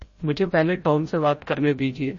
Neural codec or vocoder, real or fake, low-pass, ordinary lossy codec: codec, 16 kHz, 0.5 kbps, FreqCodec, larger model; fake; 7.2 kHz; MP3, 32 kbps